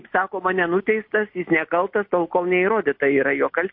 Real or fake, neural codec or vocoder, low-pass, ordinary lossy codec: real; none; 5.4 kHz; MP3, 32 kbps